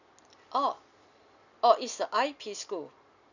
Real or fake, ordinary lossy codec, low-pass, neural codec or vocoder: real; none; 7.2 kHz; none